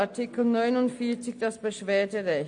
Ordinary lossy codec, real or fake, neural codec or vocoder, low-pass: MP3, 48 kbps; real; none; 9.9 kHz